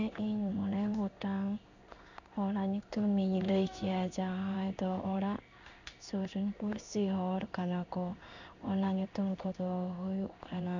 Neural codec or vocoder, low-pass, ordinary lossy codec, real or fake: codec, 16 kHz in and 24 kHz out, 1 kbps, XY-Tokenizer; 7.2 kHz; none; fake